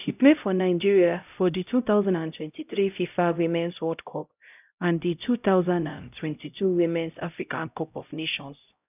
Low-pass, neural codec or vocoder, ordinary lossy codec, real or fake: 3.6 kHz; codec, 16 kHz, 0.5 kbps, X-Codec, HuBERT features, trained on LibriSpeech; none; fake